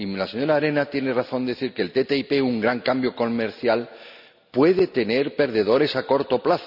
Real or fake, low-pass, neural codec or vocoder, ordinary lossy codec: real; 5.4 kHz; none; none